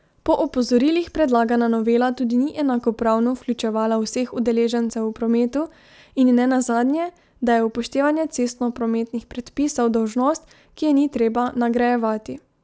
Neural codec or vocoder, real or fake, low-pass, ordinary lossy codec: none; real; none; none